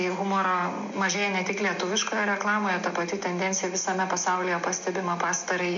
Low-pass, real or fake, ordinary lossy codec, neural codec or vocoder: 7.2 kHz; real; MP3, 48 kbps; none